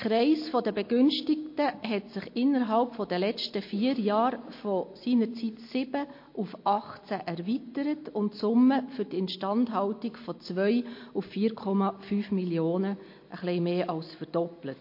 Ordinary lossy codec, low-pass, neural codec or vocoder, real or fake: MP3, 32 kbps; 5.4 kHz; none; real